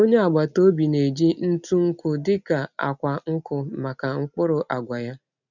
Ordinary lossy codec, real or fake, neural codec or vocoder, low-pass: none; real; none; 7.2 kHz